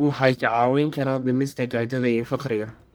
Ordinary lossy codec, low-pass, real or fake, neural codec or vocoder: none; none; fake; codec, 44.1 kHz, 1.7 kbps, Pupu-Codec